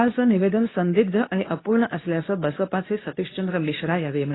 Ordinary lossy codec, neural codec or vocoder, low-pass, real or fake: AAC, 16 kbps; codec, 24 kHz, 0.9 kbps, WavTokenizer, medium speech release version 2; 7.2 kHz; fake